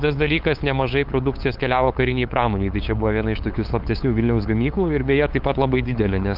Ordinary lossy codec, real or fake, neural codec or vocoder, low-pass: Opus, 32 kbps; fake; codec, 16 kHz, 8 kbps, FunCodec, trained on LibriTTS, 25 frames a second; 5.4 kHz